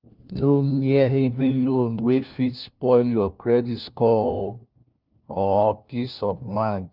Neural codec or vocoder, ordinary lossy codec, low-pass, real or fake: codec, 16 kHz, 1 kbps, FunCodec, trained on LibriTTS, 50 frames a second; Opus, 24 kbps; 5.4 kHz; fake